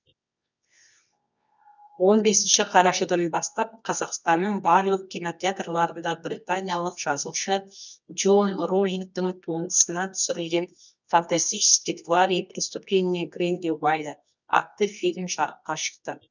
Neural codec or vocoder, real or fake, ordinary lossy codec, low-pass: codec, 24 kHz, 0.9 kbps, WavTokenizer, medium music audio release; fake; none; 7.2 kHz